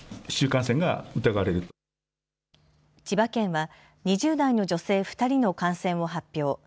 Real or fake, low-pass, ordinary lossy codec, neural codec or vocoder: real; none; none; none